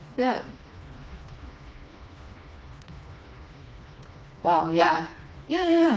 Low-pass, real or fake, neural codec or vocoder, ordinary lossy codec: none; fake; codec, 16 kHz, 2 kbps, FreqCodec, smaller model; none